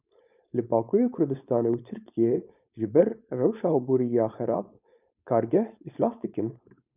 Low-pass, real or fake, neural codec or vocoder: 3.6 kHz; fake; codec, 16 kHz, 4.8 kbps, FACodec